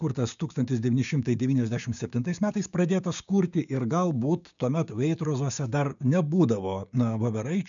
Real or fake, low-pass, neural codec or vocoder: fake; 7.2 kHz; codec, 16 kHz, 6 kbps, DAC